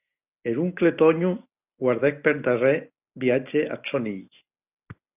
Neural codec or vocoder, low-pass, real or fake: none; 3.6 kHz; real